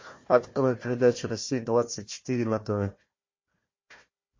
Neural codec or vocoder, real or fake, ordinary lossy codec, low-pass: codec, 16 kHz, 1 kbps, FunCodec, trained on Chinese and English, 50 frames a second; fake; MP3, 32 kbps; 7.2 kHz